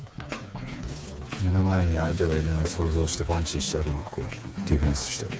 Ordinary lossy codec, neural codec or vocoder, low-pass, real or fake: none; codec, 16 kHz, 4 kbps, FreqCodec, smaller model; none; fake